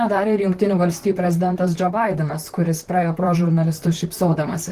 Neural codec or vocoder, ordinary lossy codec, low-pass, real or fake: vocoder, 44.1 kHz, 128 mel bands, Pupu-Vocoder; Opus, 16 kbps; 14.4 kHz; fake